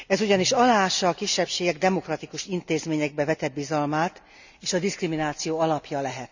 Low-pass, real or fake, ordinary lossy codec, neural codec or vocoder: 7.2 kHz; real; none; none